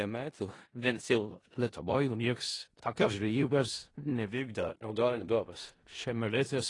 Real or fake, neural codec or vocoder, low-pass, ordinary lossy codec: fake; codec, 16 kHz in and 24 kHz out, 0.4 kbps, LongCat-Audio-Codec, four codebook decoder; 10.8 kHz; AAC, 32 kbps